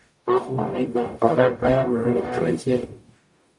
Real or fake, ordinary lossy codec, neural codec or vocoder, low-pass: fake; AAC, 64 kbps; codec, 44.1 kHz, 0.9 kbps, DAC; 10.8 kHz